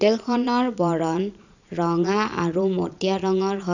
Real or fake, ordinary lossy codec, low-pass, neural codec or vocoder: fake; none; 7.2 kHz; vocoder, 22.05 kHz, 80 mel bands, Vocos